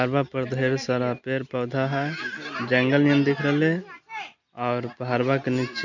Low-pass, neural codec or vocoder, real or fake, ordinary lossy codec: 7.2 kHz; none; real; none